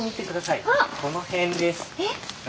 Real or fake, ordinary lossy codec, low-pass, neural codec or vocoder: real; none; none; none